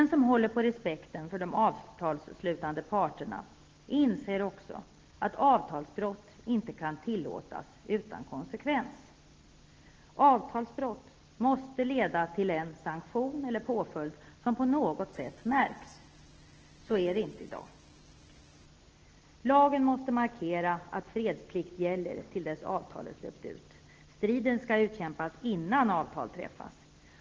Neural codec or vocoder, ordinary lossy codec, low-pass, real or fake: none; Opus, 16 kbps; 7.2 kHz; real